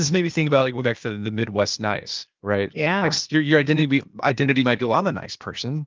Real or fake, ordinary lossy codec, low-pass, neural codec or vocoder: fake; Opus, 24 kbps; 7.2 kHz; codec, 16 kHz, 0.8 kbps, ZipCodec